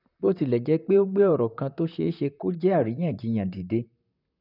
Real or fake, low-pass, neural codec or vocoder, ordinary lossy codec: fake; 5.4 kHz; vocoder, 44.1 kHz, 128 mel bands, Pupu-Vocoder; none